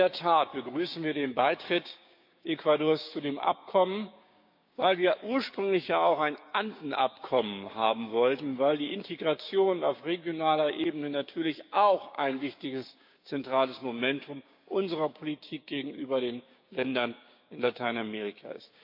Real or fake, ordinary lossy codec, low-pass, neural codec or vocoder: fake; MP3, 48 kbps; 5.4 kHz; codec, 16 kHz, 6 kbps, DAC